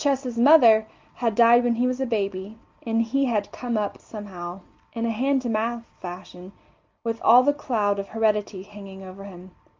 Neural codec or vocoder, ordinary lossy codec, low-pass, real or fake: none; Opus, 24 kbps; 7.2 kHz; real